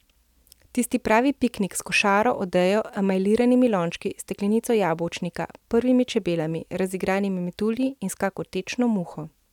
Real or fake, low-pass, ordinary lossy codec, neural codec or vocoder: real; 19.8 kHz; none; none